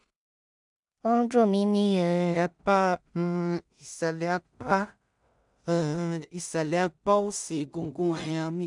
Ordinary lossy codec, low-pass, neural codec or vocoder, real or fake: none; 10.8 kHz; codec, 16 kHz in and 24 kHz out, 0.4 kbps, LongCat-Audio-Codec, two codebook decoder; fake